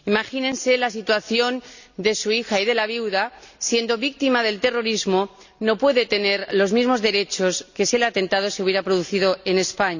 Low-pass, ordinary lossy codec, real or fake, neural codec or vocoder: 7.2 kHz; none; real; none